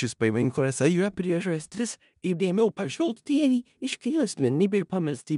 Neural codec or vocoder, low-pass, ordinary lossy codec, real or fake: codec, 16 kHz in and 24 kHz out, 0.4 kbps, LongCat-Audio-Codec, four codebook decoder; 10.8 kHz; MP3, 96 kbps; fake